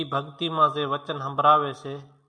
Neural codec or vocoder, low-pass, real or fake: none; 9.9 kHz; real